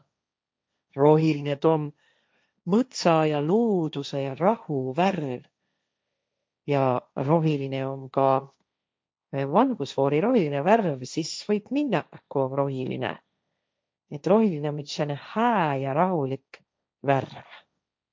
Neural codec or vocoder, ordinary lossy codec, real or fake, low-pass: codec, 16 kHz, 1.1 kbps, Voila-Tokenizer; none; fake; none